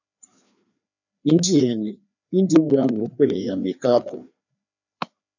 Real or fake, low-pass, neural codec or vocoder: fake; 7.2 kHz; codec, 16 kHz, 2 kbps, FreqCodec, larger model